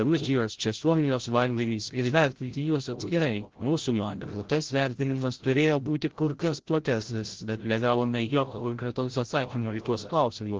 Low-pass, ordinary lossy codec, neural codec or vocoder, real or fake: 7.2 kHz; Opus, 16 kbps; codec, 16 kHz, 0.5 kbps, FreqCodec, larger model; fake